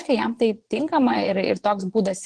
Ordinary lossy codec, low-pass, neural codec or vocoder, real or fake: Opus, 16 kbps; 9.9 kHz; vocoder, 22.05 kHz, 80 mel bands, Vocos; fake